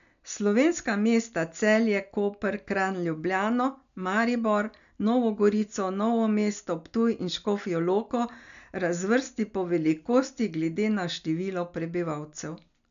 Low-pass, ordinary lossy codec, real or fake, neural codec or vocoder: 7.2 kHz; none; real; none